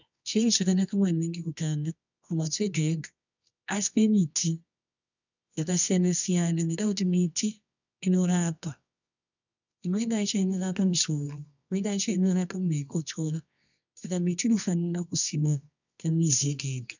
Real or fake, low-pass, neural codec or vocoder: fake; 7.2 kHz; codec, 24 kHz, 0.9 kbps, WavTokenizer, medium music audio release